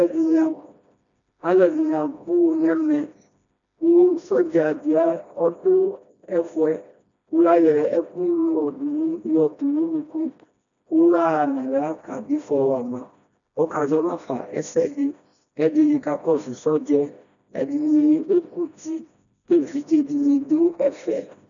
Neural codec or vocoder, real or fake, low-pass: codec, 16 kHz, 1 kbps, FreqCodec, smaller model; fake; 7.2 kHz